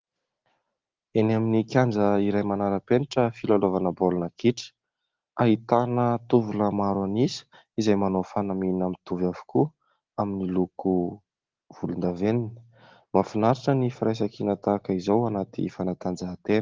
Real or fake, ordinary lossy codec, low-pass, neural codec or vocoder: real; Opus, 16 kbps; 7.2 kHz; none